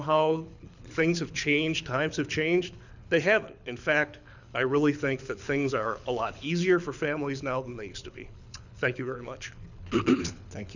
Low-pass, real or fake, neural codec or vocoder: 7.2 kHz; fake; codec, 24 kHz, 6 kbps, HILCodec